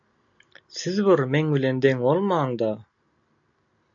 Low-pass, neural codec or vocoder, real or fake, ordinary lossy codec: 7.2 kHz; none; real; AAC, 64 kbps